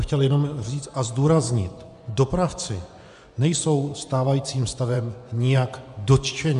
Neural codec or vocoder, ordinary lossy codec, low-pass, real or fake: vocoder, 24 kHz, 100 mel bands, Vocos; AAC, 96 kbps; 10.8 kHz; fake